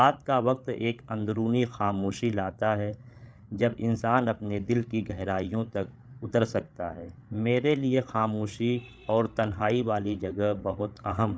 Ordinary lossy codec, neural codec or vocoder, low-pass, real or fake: none; codec, 16 kHz, 8 kbps, FreqCodec, larger model; none; fake